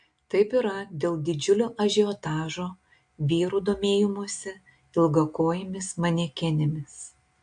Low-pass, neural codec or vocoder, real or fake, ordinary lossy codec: 9.9 kHz; none; real; AAC, 64 kbps